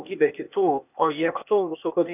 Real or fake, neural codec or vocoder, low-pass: fake; codec, 16 kHz, 0.8 kbps, ZipCodec; 3.6 kHz